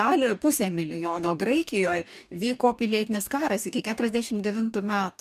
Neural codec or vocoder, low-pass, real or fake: codec, 44.1 kHz, 2.6 kbps, DAC; 14.4 kHz; fake